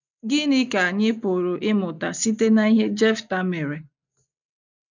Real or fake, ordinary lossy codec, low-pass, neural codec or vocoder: real; none; 7.2 kHz; none